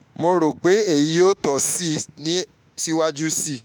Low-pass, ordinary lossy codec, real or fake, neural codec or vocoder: none; none; fake; autoencoder, 48 kHz, 32 numbers a frame, DAC-VAE, trained on Japanese speech